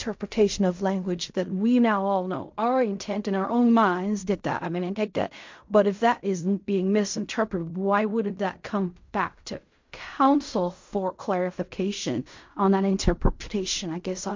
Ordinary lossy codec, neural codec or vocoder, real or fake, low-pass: MP3, 48 kbps; codec, 16 kHz in and 24 kHz out, 0.4 kbps, LongCat-Audio-Codec, fine tuned four codebook decoder; fake; 7.2 kHz